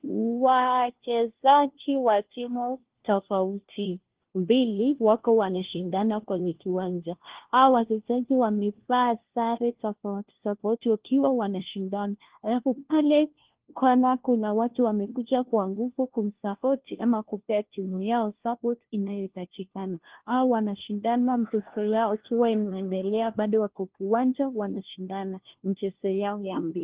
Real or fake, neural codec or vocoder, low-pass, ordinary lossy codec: fake; codec, 16 kHz, 1 kbps, FunCodec, trained on LibriTTS, 50 frames a second; 3.6 kHz; Opus, 16 kbps